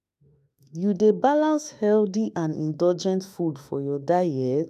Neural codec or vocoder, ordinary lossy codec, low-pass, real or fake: autoencoder, 48 kHz, 32 numbers a frame, DAC-VAE, trained on Japanese speech; none; 14.4 kHz; fake